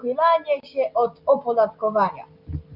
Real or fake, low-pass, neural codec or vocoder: real; 5.4 kHz; none